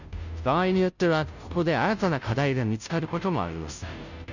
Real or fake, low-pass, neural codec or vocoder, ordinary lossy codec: fake; 7.2 kHz; codec, 16 kHz, 0.5 kbps, FunCodec, trained on Chinese and English, 25 frames a second; none